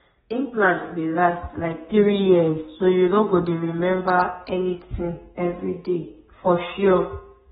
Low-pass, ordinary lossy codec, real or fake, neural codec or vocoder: 14.4 kHz; AAC, 16 kbps; fake; codec, 32 kHz, 1.9 kbps, SNAC